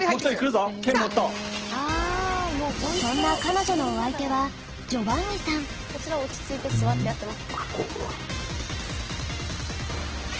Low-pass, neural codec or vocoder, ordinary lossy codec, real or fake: 7.2 kHz; none; Opus, 16 kbps; real